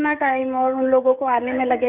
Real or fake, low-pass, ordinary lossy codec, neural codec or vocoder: fake; 3.6 kHz; none; codec, 16 kHz, 16 kbps, FreqCodec, smaller model